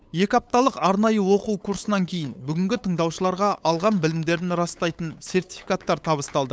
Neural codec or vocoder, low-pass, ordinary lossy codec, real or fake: codec, 16 kHz, 8 kbps, FunCodec, trained on LibriTTS, 25 frames a second; none; none; fake